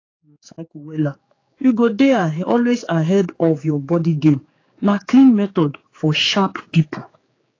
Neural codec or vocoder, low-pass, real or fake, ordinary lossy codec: codec, 16 kHz, 4 kbps, X-Codec, HuBERT features, trained on general audio; 7.2 kHz; fake; AAC, 32 kbps